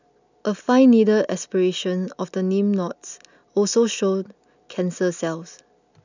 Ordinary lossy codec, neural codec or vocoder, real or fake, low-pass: none; none; real; 7.2 kHz